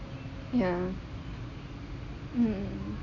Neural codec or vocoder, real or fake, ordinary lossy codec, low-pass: none; real; none; 7.2 kHz